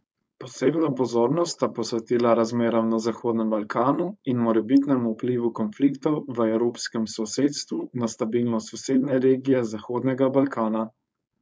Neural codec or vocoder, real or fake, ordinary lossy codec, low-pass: codec, 16 kHz, 4.8 kbps, FACodec; fake; none; none